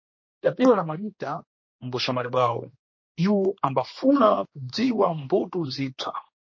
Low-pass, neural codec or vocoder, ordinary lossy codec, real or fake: 7.2 kHz; codec, 16 kHz, 2 kbps, X-Codec, HuBERT features, trained on general audio; MP3, 32 kbps; fake